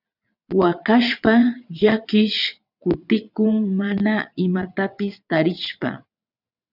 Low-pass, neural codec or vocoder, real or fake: 5.4 kHz; vocoder, 22.05 kHz, 80 mel bands, WaveNeXt; fake